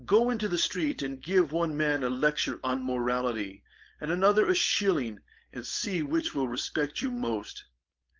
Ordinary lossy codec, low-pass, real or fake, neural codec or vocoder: Opus, 32 kbps; 7.2 kHz; fake; codec, 16 kHz, 4.8 kbps, FACodec